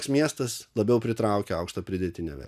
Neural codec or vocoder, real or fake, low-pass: none; real; 14.4 kHz